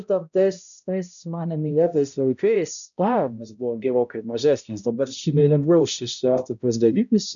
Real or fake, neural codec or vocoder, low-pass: fake; codec, 16 kHz, 0.5 kbps, X-Codec, HuBERT features, trained on balanced general audio; 7.2 kHz